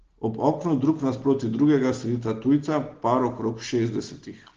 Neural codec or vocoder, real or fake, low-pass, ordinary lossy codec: none; real; 7.2 kHz; Opus, 16 kbps